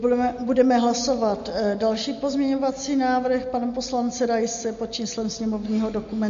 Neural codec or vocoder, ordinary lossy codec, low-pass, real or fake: none; MP3, 48 kbps; 7.2 kHz; real